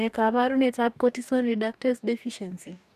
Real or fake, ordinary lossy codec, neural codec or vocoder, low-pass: fake; none; codec, 44.1 kHz, 2.6 kbps, DAC; 14.4 kHz